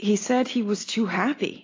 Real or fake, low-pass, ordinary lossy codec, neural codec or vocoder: real; 7.2 kHz; AAC, 32 kbps; none